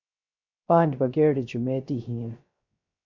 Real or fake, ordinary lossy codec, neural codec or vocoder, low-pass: fake; MP3, 64 kbps; codec, 16 kHz, 0.3 kbps, FocalCodec; 7.2 kHz